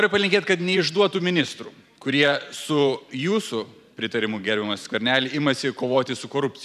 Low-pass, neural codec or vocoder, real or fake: 14.4 kHz; vocoder, 44.1 kHz, 128 mel bands every 512 samples, BigVGAN v2; fake